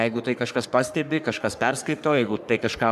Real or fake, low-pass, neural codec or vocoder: fake; 14.4 kHz; codec, 44.1 kHz, 3.4 kbps, Pupu-Codec